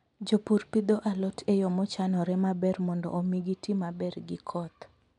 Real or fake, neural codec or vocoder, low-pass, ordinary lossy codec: real; none; 10.8 kHz; MP3, 96 kbps